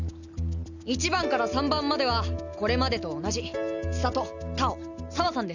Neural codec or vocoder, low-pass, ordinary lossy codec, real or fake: none; 7.2 kHz; none; real